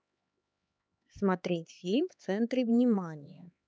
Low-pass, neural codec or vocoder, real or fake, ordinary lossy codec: none; codec, 16 kHz, 4 kbps, X-Codec, HuBERT features, trained on LibriSpeech; fake; none